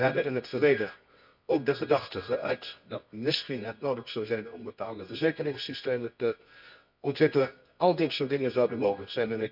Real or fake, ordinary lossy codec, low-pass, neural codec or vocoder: fake; none; 5.4 kHz; codec, 24 kHz, 0.9 kbps, WavTokenizer, medium music audio release